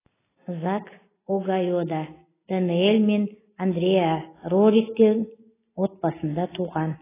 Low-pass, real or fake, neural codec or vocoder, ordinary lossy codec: 3.6 kHz; real; none; AAC, 16 kbps